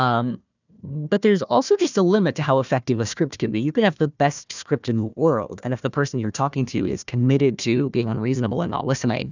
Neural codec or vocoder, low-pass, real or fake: codec, 16 kHz, 1 kbps, FunCodec, trained on Chinese and English, 50 frames a second; 7.2 kHz; fake